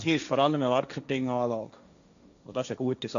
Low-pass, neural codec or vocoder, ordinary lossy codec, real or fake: 7.2 kHz; codec, 16 kHz, 1.1 kbps, Voila-Tokenizer; AAC, 96 kbps; fake